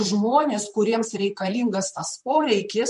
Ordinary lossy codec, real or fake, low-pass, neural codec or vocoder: MP3, 48 kbps; fake; 14.4 kHz; vocoder, 44.1 kHz, 128 mel bands, Pupu-Vocoder